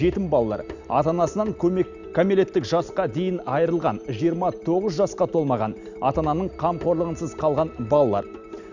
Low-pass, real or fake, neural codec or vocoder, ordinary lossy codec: 7.2 kHz; real; none; none